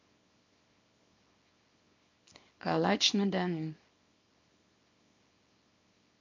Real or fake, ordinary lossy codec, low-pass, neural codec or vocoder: fake; MP3, 48 kbps; 7.2 kHz; codec, 24 kHz, 0.9 kbps, WavTokenizer, small release